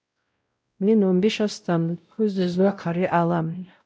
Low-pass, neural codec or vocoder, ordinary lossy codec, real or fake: none; codec, 16 kHz, 0.5 kbps, X-Codec, WavLM features, trained on Multilingual LibriSpeech; none; fake